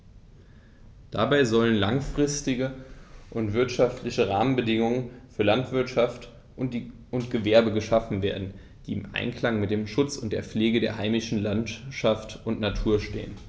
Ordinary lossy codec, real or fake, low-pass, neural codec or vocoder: none; real; none; none